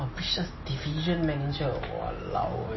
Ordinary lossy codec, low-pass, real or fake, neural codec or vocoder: MP3, 24 kbps; 7.2 kHz; real; none